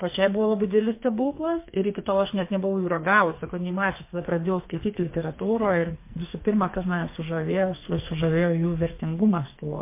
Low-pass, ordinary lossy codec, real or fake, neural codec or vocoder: 3.6 kHz; MP3, 24 kbps; fake; codec, 44.1 kHz, 2.6 kbps, SNAC